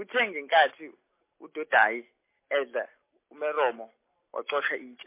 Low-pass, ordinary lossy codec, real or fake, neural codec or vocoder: 3.6 kHz; MP3, 24 kbps; real; none